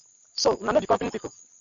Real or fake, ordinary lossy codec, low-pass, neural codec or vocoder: real; AAC, 64 kbps; 7.2 kHz; none